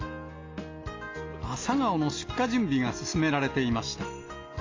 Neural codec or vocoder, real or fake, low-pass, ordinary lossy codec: none; real; 7.2 kHz; AAC, 32 kbps